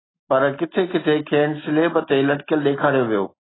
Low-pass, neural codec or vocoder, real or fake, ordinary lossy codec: 7.2 kHz; none; real; AAC, 16 kbps